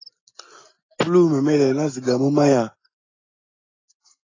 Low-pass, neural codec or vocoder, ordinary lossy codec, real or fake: 7.2 kHz; none; AAC, 32 kbps; real